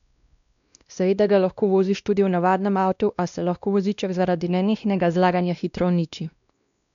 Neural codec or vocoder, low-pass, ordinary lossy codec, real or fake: codec, 16 kHz, 1 kbps, X-Codec, WavLM features, trained on Multilingual LibriSpeech; 7.2 kHz; none; fake